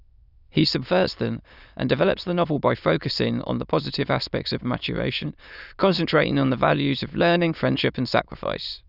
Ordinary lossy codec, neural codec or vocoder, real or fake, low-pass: none; autoencoder, 22.05 kHz, a latent of 192 numbers a frame, VITS, trained on many speakers; fake; 5.4 kHz